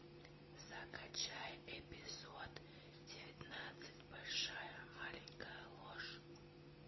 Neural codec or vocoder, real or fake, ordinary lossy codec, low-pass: none; real; MP3, 24 kbps; 7.2 kHz